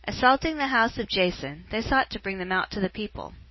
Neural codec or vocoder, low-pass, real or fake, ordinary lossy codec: none; 7.2 kHz; real; MP3, 24 kbps